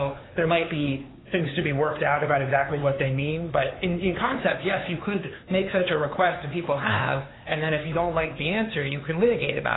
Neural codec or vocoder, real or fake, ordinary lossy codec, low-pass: codec, 16 kHz, 4 kbps, X-Codec, HuBERT features, trained on LibriSpeech; fake; AAC, 16 kbps; 7.2 kHz